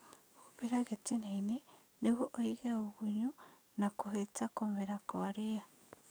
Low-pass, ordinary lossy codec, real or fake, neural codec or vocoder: none; none; fake; codec, 44.1 kHz, 7.8 kbps, DAC